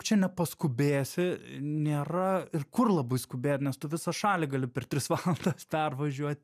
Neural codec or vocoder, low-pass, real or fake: none; 14.4 kHz; real